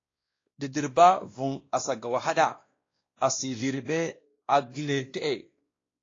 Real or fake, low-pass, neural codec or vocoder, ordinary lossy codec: fake; 7.2 kHz; codec, 16 kHz, 1 kbps, X-Codec, WavLM features, trained on Multilingual LibriSpeech; AAC, 32 kbps